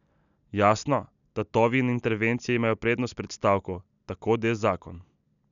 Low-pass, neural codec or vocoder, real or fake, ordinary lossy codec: 7.2 kHz; none; real; none